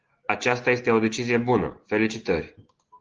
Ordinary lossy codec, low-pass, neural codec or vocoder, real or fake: Opus, 16 kbps; 7.2 kHz; none; real